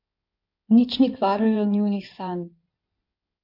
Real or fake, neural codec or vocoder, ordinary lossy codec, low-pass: fake; codec, 16 kHz in and 24 kHz out, 2.2 kbps, FireRedTTS-2 codec; MP3, 48 kbps; 5.4 kHz